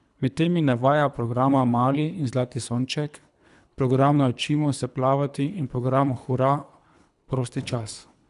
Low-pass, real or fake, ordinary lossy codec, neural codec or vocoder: 10.8 kHz; fake; none; codec, 24 kHz, 3 kbps, HILCodec